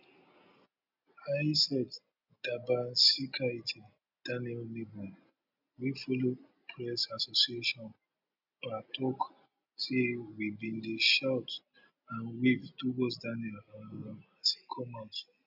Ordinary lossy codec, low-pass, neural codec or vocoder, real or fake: none; 5.4 kHz; none; real